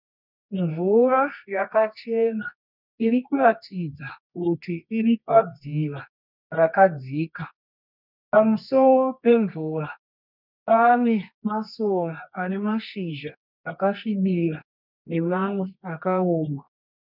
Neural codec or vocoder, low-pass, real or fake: codec, 24 kHz, 0.9 kbps, WavTokenizer, medium music audio release; 5.4 kHz; fake